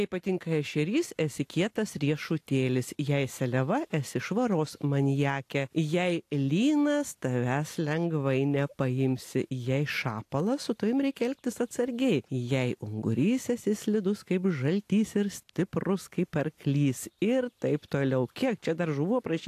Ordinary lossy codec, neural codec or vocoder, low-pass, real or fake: AAC, 64 kbps; none; 14.4 kHz; real